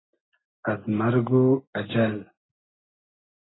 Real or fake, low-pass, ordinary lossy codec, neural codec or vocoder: real; 7.2 kHz; AAC, 16 kbps; none